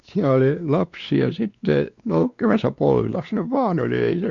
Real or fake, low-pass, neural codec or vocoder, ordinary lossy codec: fake; 7.2 kHz; codec, 16 kHz, 2 kbps, X-Codec, WavLM features, trained on Multilingual LibriSpeech; Opus, 64 kbps